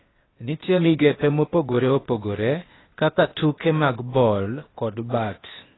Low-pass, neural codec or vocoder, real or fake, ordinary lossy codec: 7.2 kHz; codec, 16 kHz, 0.8 kbps, ZipCodec; fake; AAC, 16 kbps